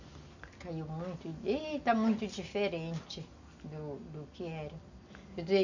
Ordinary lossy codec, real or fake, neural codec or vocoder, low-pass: none; real; none; 7.2 kHz